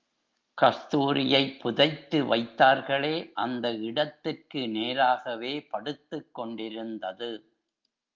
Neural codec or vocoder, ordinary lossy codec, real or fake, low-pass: none; Opus, 24 kbps; real; 7.2 kHz